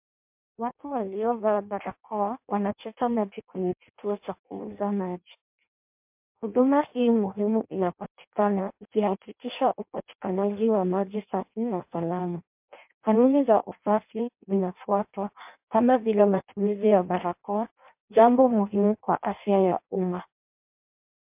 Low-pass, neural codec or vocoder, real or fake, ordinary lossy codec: 3.6 kHz; codec, 16 kHz in and 24 kHz out, 0.6 kbps, FireRedTTS-2 codec; fake; MP3, 32 kbps